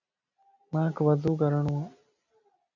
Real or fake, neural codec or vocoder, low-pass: real; none; 7.2 kHz